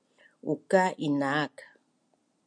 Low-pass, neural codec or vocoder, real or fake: 9.9 kHz; none; real